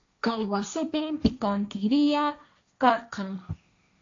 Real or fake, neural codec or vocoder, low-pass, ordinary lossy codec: fake; codec, 16 kHz, 1.1 kbps, Voila-Tokenizer; 7.2 kHz; AAC, 48 kbps